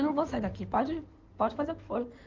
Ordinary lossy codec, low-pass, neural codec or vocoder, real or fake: Opus, 32 kbps; 7.2 kHz; codec, 16 kHz in and 24 kHz out, 2.2 kbps, FireRedTTS-2 codec; fake